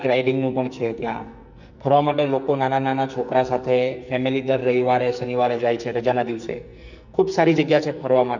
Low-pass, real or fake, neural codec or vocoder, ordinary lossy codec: 7.2 kHz; fake; codec, 44.1 kHz, 2.6 kbps, SNAC; none